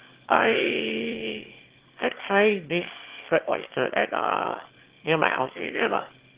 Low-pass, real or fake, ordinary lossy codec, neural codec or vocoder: 3.6 kHz; fake; Opus, 16 kbps; autoencoder, 22.05 kHz, a latent of 192 numbers a frame, VITS, trained on one speaker